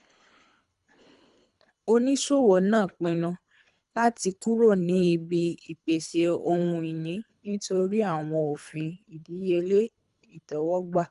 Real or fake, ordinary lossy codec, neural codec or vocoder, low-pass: fake; none; codec, 24 kHz, 3 kbps, HILCodec; 10.8 kHz